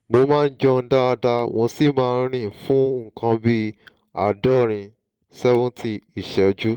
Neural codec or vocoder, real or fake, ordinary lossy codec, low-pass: vocoder, 44.1 kHz, 128 mel bands every 512 samples, BigVGAN v2; fake; Opus, 24 kbps; 19.8 kHz